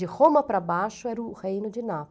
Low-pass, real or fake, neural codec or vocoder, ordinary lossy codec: none; real; none; none